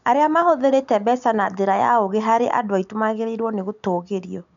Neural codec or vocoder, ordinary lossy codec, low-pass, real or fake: none; none; 7.2 kHz; real